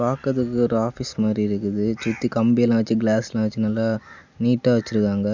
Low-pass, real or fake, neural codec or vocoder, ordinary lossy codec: 7.2 kHz; real; none; none